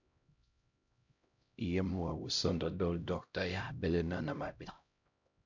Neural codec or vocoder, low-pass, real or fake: codec, 16 kHz, 0.5 kbps, X-Codec, HuBERT features, trained on LibriSpeech; 7.2 kHz; fake